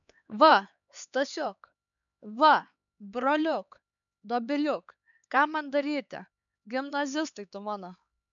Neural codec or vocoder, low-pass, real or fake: codec, 16 kHz, 4 kbps, X-Codec, HuBERT features, trained on LibriSpeech; 7.2 kHz; fake